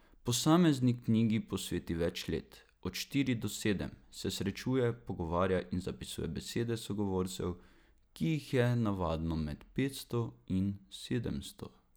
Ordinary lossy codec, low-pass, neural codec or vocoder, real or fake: none; none; none; real